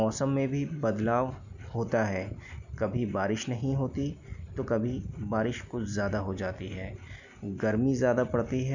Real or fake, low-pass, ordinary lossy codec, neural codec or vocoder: real; 7.2 kHz; none; none